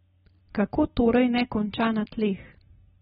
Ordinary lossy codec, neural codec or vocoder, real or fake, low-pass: AAC, 16 kbps; none; real; 10.8 kHz